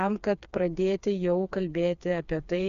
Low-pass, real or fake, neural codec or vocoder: 7.2 kHz; fake; codec, 16 kHz, 4 kbps, FreqCodec, smaller model